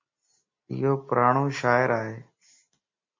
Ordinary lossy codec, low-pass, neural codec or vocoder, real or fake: MP3, 32 kbps; 7.2 kHz; none; real